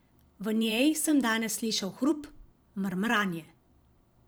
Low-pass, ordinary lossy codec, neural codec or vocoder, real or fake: none; none; vocoder, 44.1 kHz, 128 mel bands every 512 samples, BigVGAN v2; fake